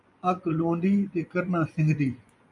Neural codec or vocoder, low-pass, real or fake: vocoder, 24 kHz, 100 mel bands, Vocos; 10.8 kHz; fake